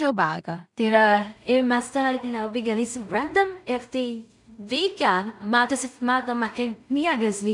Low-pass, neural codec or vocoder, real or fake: 10.8 kHz; codec, 16 kHz in and 24 kHz out, 0.4 kbps, LongCat-Audio-Codec, two codebook decoder; fake